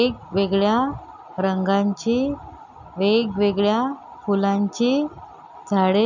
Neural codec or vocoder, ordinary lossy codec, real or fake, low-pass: none; none; real; 7.2 kHz